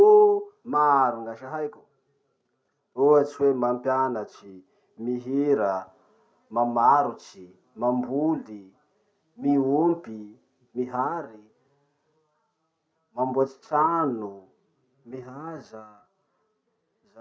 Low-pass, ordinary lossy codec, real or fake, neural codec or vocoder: none; none; real; none